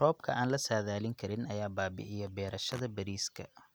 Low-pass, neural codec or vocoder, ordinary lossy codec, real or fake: none; none; none; real